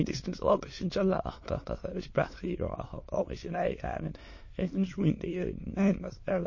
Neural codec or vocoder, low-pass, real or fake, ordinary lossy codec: autoencoder, 22.05 kHz, a latent of 192 numbers a frame, VITS, trained on many speakers; 7.2 kHz; fake; MP3, 32 kbps